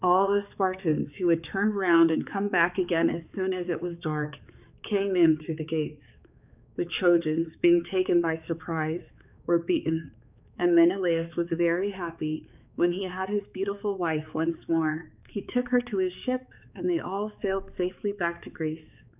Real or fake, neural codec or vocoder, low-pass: fake; codec, 16 kHz, 4 kbps, X-Codec, HuBERT features, trained on balanced general audio; 3.6 kHz